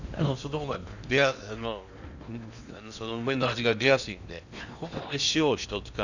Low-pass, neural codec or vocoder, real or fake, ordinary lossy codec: 7.2 kHz; codec, 16 kHz in and 24 kHz out, 0.8 kbps, FocalCodec, streaming, 65536 codes; fake; none